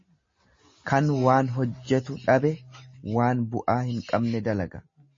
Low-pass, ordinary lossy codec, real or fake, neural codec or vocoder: 7.2 kHz; MP3, 32 kbps; real; none